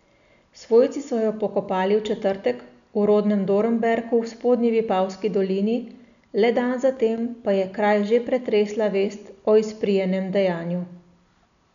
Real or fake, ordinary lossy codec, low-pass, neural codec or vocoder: real; none; 7.2 kHz; none